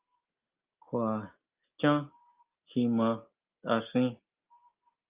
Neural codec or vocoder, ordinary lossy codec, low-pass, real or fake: none; Opus, 24 kbps; 3.6 kHz; real